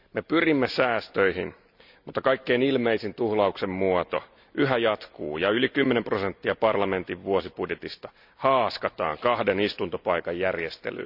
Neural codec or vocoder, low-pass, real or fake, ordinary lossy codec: none; 5.4 kHz; real; none